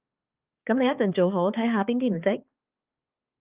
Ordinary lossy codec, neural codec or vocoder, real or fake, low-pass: Opus, 32 kbps; codec, 16 kHz, 4 kbps, X-Codec, HuBERT features, trained on balanced general audio; fake; 3.6 kHz